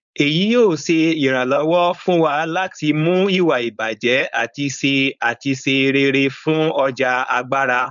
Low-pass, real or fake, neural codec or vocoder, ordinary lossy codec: 7.2 kHz; fake; codec, 16 kHz, 4.8 kbps, FACodec; none